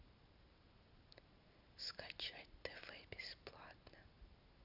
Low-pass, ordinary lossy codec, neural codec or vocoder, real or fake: 5.4 kHz; none; none; real